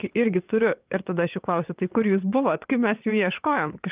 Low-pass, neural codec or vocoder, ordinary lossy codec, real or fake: 3.6 kHz; vocoder, 22.05 kHz, 80 mel bands, WaveNeXt; Opus, 32 kbps; fake